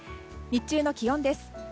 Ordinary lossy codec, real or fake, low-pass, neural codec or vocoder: none; real; none; none